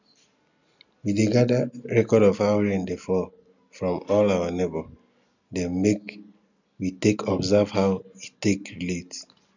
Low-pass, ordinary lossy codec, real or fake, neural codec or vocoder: 7.2 kHz; none; real; none